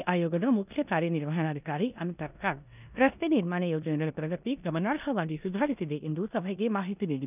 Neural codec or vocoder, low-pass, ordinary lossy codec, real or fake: codec, 16 kHz in and 24 kHz out, 0.9 kbps, LongCat-Audio-Codec, four codebook decoder; 3.6 kHz; none; fake